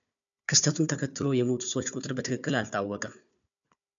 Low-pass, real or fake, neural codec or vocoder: 7.2 kHz; fake; codec, 16 kHz, 4 kbps, FunCodec, trained on Chinese and English, 50 frames a second